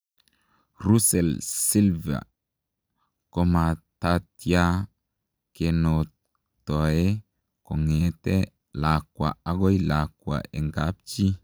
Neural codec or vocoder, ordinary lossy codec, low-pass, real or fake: none; none; none; real